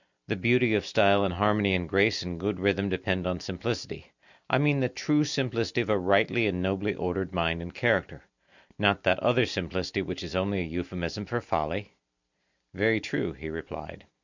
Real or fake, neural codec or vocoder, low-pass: real; none; 7.2 kHz